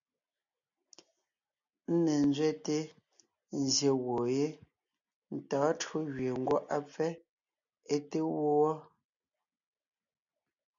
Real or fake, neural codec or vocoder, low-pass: real; none; 7.2 kHz